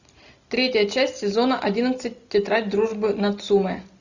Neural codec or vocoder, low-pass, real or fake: none; 7.2 kHz; real